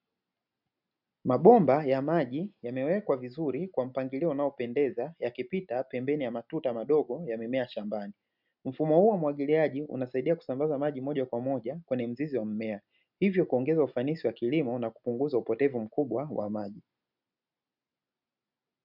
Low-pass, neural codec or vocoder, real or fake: 5.4 kHz; none; real